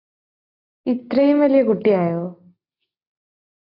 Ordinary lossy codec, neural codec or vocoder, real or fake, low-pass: AAC, 32 kbps; none; real; 5.4 kHz